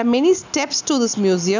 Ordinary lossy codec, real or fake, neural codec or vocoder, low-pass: none; real; none; 7.2 kHz